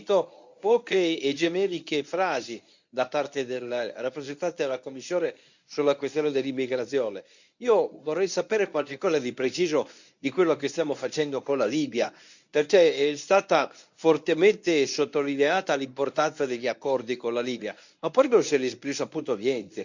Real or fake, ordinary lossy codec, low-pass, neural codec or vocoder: fake; none; 7.2 kHz; codec, 24 kHz, 0.9 kbps, WavTokenizer, medium speech release version 1